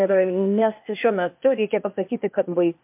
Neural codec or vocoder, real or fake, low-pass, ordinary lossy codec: codec, 16 kHz, 0.8 kbps, ZipCodec; fake; 3.6 kHz; MP3, 32 kbps